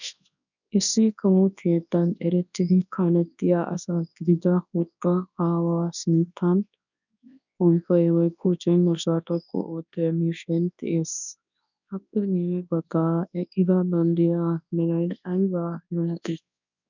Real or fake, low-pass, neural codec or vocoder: fake; 7.2 kHz; codec, 24 kHz, 0.9 kbps, WavTokenizer, large speech release